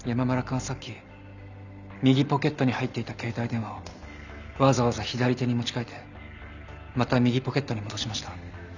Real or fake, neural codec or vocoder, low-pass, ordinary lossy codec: real; none; 7.2 kHz; none